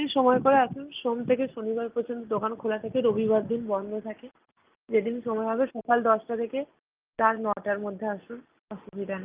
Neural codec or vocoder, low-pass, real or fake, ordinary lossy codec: none; 3.6 kHz; real; Opus, 32 kbps